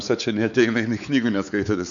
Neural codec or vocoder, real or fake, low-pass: codec, 16 kHz, 4 kbps, X-Codec, WavLM features, trained on Multilingual LibriSpeech; fake; 7.2 kHz